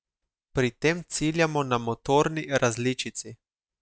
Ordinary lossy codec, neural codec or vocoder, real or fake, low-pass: none; none; real; none